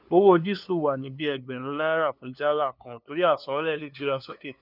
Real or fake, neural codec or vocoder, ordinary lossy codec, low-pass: fake; codec, 16 kHz, 2 kbps, FunCodec, trained on LibriTTS, 25 frames a second; none; 5.4 kHz